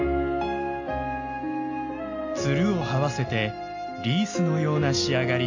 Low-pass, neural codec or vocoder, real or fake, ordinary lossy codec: 7.2 kHz; none; real; AAC, 48 kbps